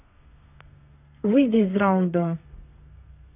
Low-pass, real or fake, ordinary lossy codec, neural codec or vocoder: 3.6 kHz; fake; none; codec, 32 kHz, 1.9 kbps, SNAC